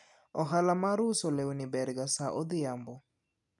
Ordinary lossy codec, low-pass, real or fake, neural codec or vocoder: none; 10.8 kHz; real; none